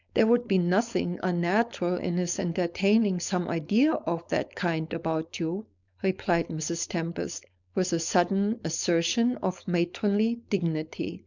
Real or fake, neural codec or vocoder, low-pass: fake; codec, 16 kHz, 4.8 kbps, FACodec; 7.2 kHz